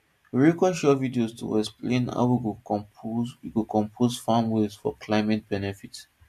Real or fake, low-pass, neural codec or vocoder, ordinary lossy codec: real; 14.4 kHz; none; MP3, 64 kbps